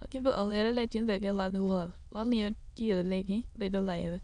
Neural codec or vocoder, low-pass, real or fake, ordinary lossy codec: autoencoder, 22.05 kHz, a latent of 192 numbers a frame, VITS, trained on many speakers; 9.9 kHz; fake; none